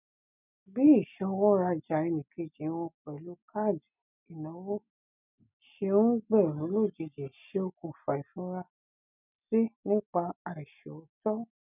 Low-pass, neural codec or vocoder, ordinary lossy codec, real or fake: 3.6 kHz; none; none; real